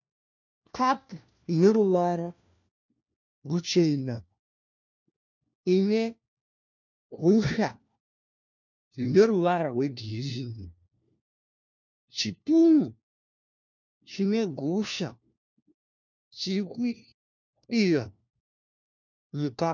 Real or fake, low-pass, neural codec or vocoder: fake; 7.2 kHz; codec, 16 kHz, 1 kbps, FunCodec, trained on LibriTTS, 50 frames a second